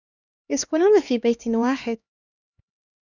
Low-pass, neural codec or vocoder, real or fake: 7.2 kHz; codec, 16 kHz, 2 kbps, X-Codec, HuBERT features, trained on LibriSpeech; fake